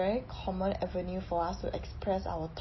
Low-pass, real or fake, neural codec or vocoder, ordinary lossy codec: 7.2 kHz; real; none; MP3, 24 kbps